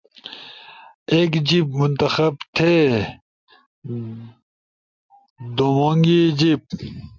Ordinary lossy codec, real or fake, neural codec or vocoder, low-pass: MP3, 64 kbps; real; none; 7.2 kHz